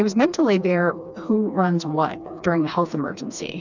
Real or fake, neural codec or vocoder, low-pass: fake; codec, 16 kHz, 2 kbps, FreqCodec, smaller model; 7.2 kHz